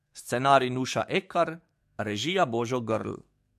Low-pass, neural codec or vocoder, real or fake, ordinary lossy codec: 14.4 kHz; codec, 44.1 kHz, 7.8 kbps, DAC; fake; MP3, 64 kbps